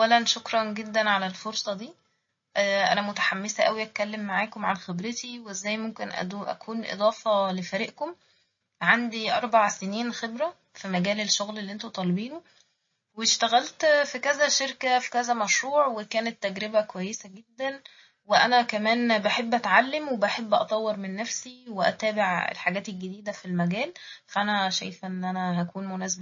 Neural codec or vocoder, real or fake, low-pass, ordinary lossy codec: none; real; 7.2 kHz; MP3, 32 kbps